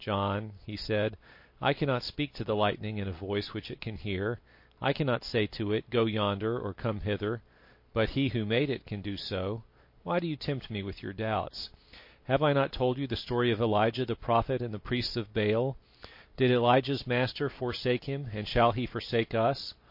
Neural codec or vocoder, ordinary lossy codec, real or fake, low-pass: none; MP3, 32 kbps; real; 5.4 kHz